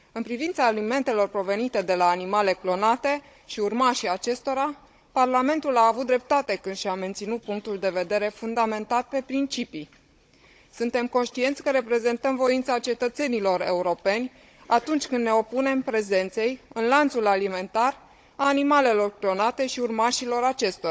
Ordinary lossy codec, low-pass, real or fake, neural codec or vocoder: none; none; fake; codec, 16 kHz, 16 kbps, FunCodec, trained on Chinese and English, 50 frames a second